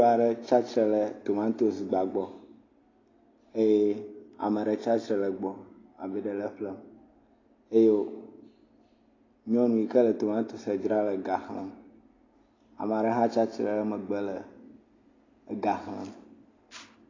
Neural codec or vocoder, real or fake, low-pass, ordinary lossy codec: none; real; 7.2 kHz; AAC, 32 kbps